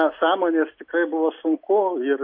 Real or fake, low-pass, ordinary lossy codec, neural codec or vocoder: real; 5.4 kHz; MP3, 32 kbps; none